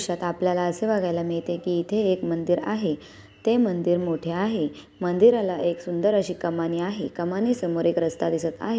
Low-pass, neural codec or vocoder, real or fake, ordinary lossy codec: none; none; real; none